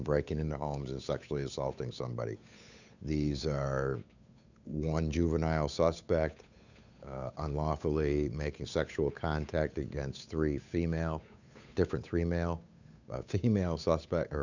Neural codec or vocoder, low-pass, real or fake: codec, 16 kHz, 8 kbps, FunCodec, trained on Chinese and English, 25 frames a second; 7.2 kHz; fake